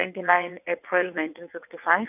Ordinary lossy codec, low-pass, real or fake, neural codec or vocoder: none; 3.6 kHz; fake; vocoder, 22.05 kHz, 80 mel bands, WaveNeXt